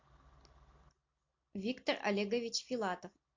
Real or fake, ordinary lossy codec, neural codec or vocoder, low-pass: real; MP3, 48 kbps; none; 7.2 kHz